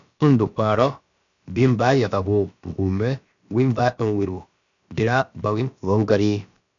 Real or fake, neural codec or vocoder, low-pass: fake; codec, 16 kHz, about 1 kbps, DyCAST, with the encoder's durations; 7.2 kHz